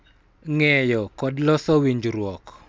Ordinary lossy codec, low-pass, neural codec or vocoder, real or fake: none; none; none; real